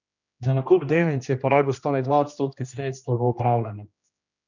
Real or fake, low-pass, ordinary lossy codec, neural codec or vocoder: fake; 7.2 kHz; none; codec, 16 kHz, 1 kbps, X-Codec, HuBERT features, trained on general audio